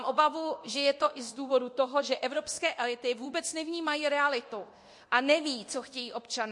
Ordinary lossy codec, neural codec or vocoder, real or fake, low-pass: MP3, 48 kbps; codec, 24 kHz, 0.9 kbps, DualCodec; fake; 10.8 kHz